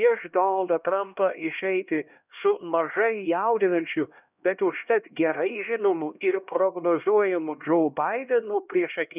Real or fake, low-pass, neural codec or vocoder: fake; 3.6 kHz; codec, 16 kHz, 1 kbps, X-Codec, HuBERT features, trained on LibriSpeech